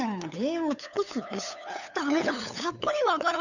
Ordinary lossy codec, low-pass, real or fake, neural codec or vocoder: none; 7.2 kHz; fake; codec, 16 kHz, 8 kbps, FunCodec, trained on LibriTTS, 25 frames a second